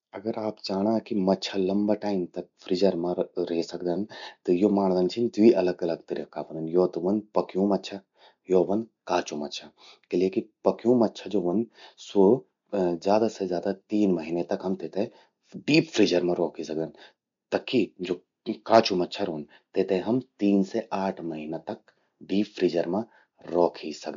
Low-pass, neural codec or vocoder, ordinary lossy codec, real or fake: 7.2 kHz; none; MP3, 64 kbps; real